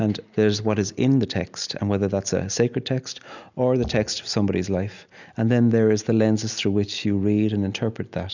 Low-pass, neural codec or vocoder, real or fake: 7.2 kHz; none; real